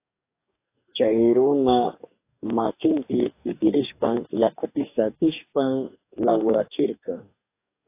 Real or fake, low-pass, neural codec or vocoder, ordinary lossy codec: fake; 3.6 kHz; codec, 44.1 kHz, 2.6 kbps, DAC; AAC, 24 kbps